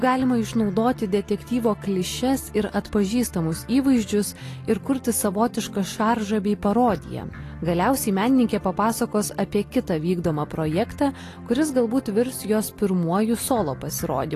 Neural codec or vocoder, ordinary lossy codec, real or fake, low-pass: none; AAC, 48 kbps; real; 14.4 kHz